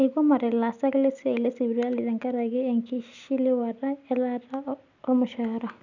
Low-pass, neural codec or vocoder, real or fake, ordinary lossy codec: 7.2 kHz; none; real; none